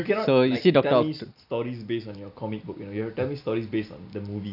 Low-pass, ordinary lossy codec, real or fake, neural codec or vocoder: 5.4 kHz; none; real; none